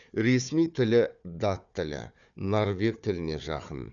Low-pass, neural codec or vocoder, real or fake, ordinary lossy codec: 7.2 kHz; codec, 16 kHz, 4 kbps, FunCodec, trained on Chinese and English, 50 frames a second; fake; none